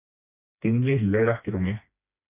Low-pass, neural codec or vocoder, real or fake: 3.6 kHz; codec, 16 kHz, 2 kbps, FreqCodec, smaller model; fake